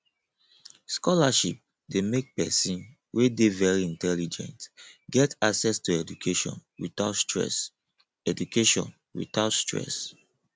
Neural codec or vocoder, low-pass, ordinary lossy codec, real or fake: none; none; none; real